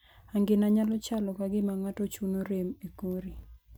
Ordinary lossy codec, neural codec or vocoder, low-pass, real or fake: none; none; none; real